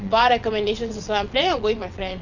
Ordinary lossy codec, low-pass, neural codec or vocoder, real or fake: none; 7.2 kHz; vocoder, 22.05 kHz, 80 mel bands, WaveNeXt; fake